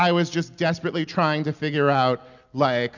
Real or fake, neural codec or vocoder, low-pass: real; none; 7.2 kHz